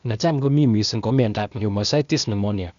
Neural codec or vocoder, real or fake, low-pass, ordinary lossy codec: codec, 16 kHz, 0.8 kbps, ZipCodec; fake; 7.2 kHz; MP3, 96 kbps